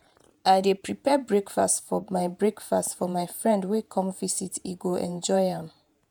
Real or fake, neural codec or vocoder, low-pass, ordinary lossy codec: fake; vocoder, 48 kHz, 128 mel bands, Vocos; none; none